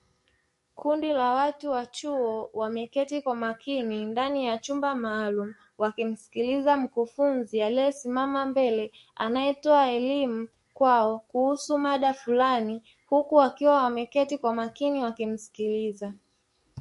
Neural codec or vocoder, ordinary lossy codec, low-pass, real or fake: codec, 44.1 kHz, 7.8 kbps, DAC; MP3, 48 kbps; 14.4 kHz; fake